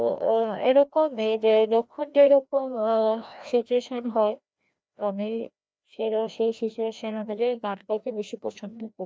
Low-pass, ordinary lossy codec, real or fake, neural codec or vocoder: none; none; fake; codec, 16 kHz, 1 kbps, FreqCodec, larger model